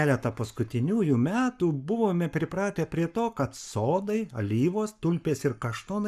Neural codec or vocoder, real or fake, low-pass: codec, 44.1 kHz, 7.8 kbps, Pupu-Codec; fake; 14.4 kHz